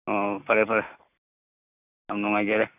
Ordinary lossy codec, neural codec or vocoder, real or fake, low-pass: none; none; real; 3.6 kHz